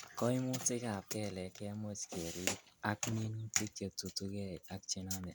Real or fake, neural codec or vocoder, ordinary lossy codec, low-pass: real; none; none; none